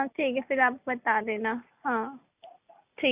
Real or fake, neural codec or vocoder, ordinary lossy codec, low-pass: real; none; none; 3.6 kHz